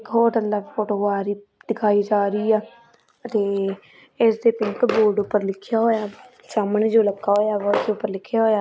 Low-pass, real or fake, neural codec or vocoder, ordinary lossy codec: none; real; none; none